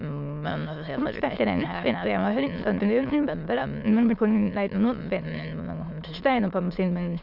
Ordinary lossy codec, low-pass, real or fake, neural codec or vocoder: none; 5.4 kHz; fake; autoencoder, 22.05 kHz, a latent of 192 numbers a frame, VITS, trained on many speakers